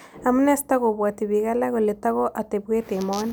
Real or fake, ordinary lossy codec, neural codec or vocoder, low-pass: real; none; none; none